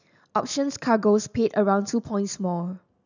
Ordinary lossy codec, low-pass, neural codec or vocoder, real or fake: none; 7.2 kHz; none; real